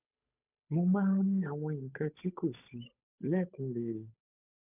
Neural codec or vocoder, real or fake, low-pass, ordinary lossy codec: codec, 16 kHz, 8 kbps, FunCodec, trained on Chinese and English, 25 frames a second; fake; 3.6 kHz; none